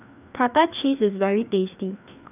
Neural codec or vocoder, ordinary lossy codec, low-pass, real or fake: codec, 16 kHz, 2 kbps, FreqCodec, larger model; none; 3.6 kHz; fake